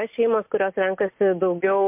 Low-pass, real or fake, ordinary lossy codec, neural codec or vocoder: 3.6 kHz; real; MP3, 32 kbps; none